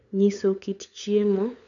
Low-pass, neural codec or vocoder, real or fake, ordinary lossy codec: 7.2 kHz; codec, 16 kHz, 8 kbps, FunCodec, trained on LibriTTS, 25 frames a second; fake; none